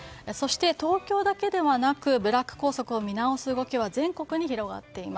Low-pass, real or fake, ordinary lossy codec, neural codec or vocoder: none; real; none; none